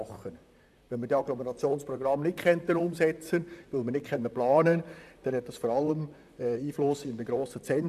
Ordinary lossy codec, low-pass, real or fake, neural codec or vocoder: none; 14.4 kHz; fake; vocoder, 44.1 kHz, 128 mel bands, Pupu-Vocoder